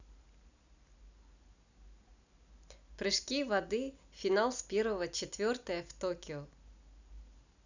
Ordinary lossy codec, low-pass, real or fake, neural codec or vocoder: none; 7.2 kHz; real; none